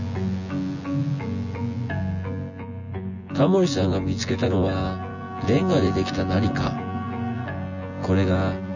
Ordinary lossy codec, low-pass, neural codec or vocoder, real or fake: none; 7.2 kHz; vocoder, 24 kHz, 100 mel bands, Vocos; fake